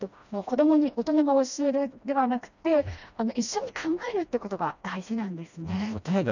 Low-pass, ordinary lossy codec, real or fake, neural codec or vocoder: 7.2 kHz; none; fake; codec, 16 kHz, 1 kbps, FreqCodec, smaller model